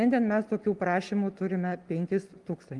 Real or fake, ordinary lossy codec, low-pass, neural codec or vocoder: real; Opus, 24 kbps; 10.8 kHz; none